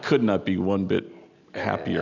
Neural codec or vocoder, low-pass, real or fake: none; 7.2 kHz; real